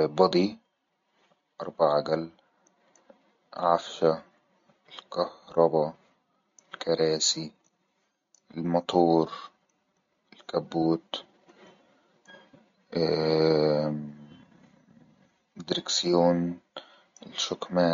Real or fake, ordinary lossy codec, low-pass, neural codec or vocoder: real; MP3, 48 kbps; 7.2 kHz; none